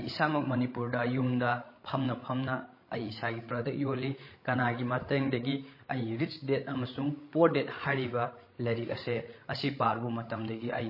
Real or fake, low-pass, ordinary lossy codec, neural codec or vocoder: fake; 5.4 kHz; MP3, 24 kbps; codec, 16 kHz, 16 kbps, FreqCodec, larger model